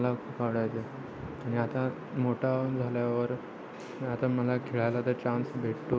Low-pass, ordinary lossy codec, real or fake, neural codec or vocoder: none; none; real; none